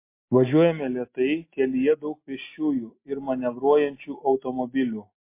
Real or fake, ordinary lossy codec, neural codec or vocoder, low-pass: real; MP3, 24 kbps; none; 3.6 kHz